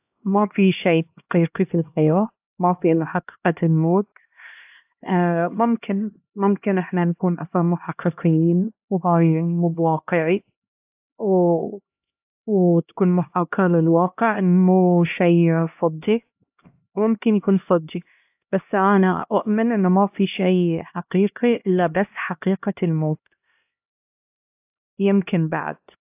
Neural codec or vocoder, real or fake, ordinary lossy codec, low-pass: codec, 16 kHz, 1 kbps, X-Codec, HuBERT features, trained on LibriSpeech; fake; AAC, 32 kbps; 3.6 kHz